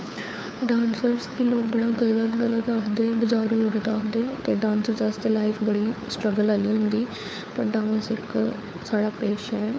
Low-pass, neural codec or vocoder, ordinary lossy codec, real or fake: none; codec, 16 kHz, 4 kbps, FunCodec, trained on LibriTTS, 50 frames a second; none; fake